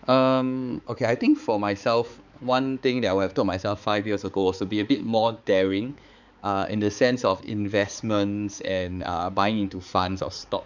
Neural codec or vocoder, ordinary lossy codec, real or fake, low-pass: codec, 16 kHz, 4 kbps, X-Codec, HuBERT features, trained on balanced general audio; none; fake; 7.2 kHz